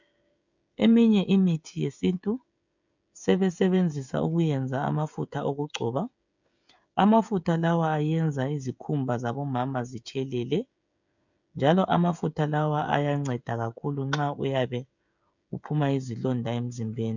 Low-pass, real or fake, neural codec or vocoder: 7.2 kHz; fake; codec, 16 kHz, 16 kbps, FreqCodec, smaller model